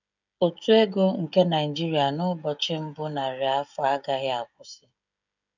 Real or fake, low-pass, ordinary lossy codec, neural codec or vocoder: fake; 7.2 kHz; none; codec, 16 kHz, 16 kbps, FreqCodec, smaller model